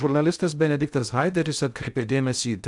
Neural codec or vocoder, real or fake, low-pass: codec, 16 kHz in and 24 kHz out, 0.6 kbps, FocalCodec, streaming, 2048 codes; fake; 10.8 kHz